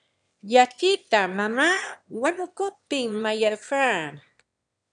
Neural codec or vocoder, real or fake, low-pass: autoencoder, 22.05 kHz, a latent of 192 numbers a frame, VITS, trained on one speaker; fake; 9.9 kHz